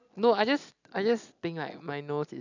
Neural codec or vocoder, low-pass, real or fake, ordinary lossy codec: none; 7.2 kHz; real; none